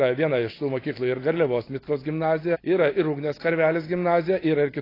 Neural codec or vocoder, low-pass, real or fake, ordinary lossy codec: none; 5.4 kHz; real; AAC, 24 kbps